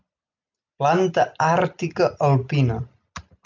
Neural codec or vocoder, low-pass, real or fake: none; 7.2 kHz; real